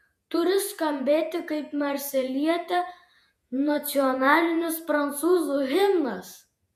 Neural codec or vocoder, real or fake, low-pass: vocoder, 48 kHz, 128 mel bands, Vocos; fake; 14.4 kHz